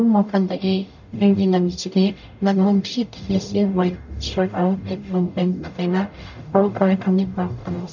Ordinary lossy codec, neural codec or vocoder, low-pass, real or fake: none; codec, 44.1 kHz, 0.9 kbps, DAC; 7.2 kHz; fake